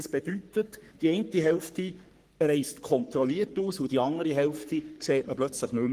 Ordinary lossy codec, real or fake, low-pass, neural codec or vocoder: Opus, 24 kbps; fake; 14.4 kHz; codec, 44.1 kHz, 3.4 kbps, Pupu-Codec